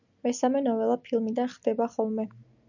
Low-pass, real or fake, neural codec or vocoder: 7.2 kHz; real; none